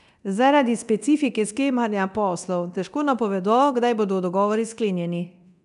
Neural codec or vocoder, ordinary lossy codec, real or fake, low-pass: codec, 24 kHz, 0.9 kbps, DualCodec; none; fake; 10.8 kHz